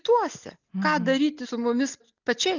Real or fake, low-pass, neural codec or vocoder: real; 7.2 kHz; none